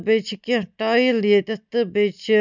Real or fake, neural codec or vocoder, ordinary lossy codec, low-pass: real; none; none; 7.2 kHz